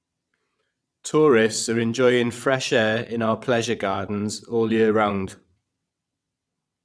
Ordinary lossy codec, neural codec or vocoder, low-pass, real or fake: none; vocoder, 22.05 kHz, 80 mel bands, WaveNeXt; none; fake